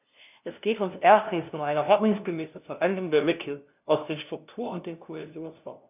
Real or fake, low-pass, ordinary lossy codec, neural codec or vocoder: fake; 3.6 kHz; none; codec, 16 kHz, 0.5 kbps, FunCodec, trained on LibriTTS, 25 frames a second